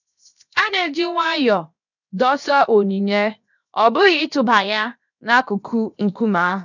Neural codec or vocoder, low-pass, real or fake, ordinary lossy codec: codec, 16 kHz, 0.7 kbps, FocalCodec; 7.2 kHz; fake; none